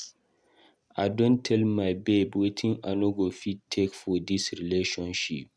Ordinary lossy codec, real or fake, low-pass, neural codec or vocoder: none; real; none; none